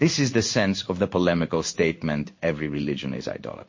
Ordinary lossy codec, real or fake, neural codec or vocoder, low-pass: MP3, 32 kbps; fake; codec, 16 kHz in and 24 kHz out, 1 kbps, XY-Tokenizer; 7.2 kHz